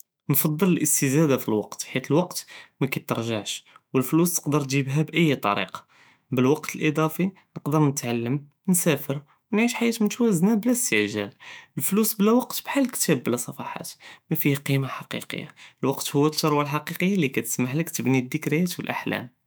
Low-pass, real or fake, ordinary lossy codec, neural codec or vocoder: none; fake; none; autoencoder, 48 kHz, 128 numbers a frame, DAC-VAE, trained on Japanese speech